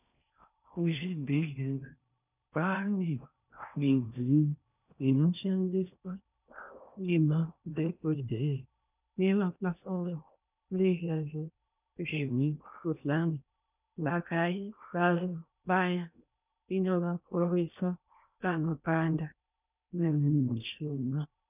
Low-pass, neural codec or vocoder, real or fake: 3.6 kHz; codec, 16 kHz in and 24 kHz out, 0.6 kbps, FocalCodec, streaming, 4096 codes; fake